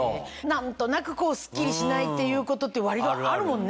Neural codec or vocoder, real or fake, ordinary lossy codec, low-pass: none; real; none; none